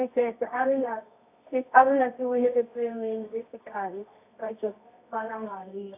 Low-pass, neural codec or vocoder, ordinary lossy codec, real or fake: 3.6 kHz; codec, 24 kHz, 0.9 kbps, WavTokenizer, medium music audio release; AAC, 24 kbps; fake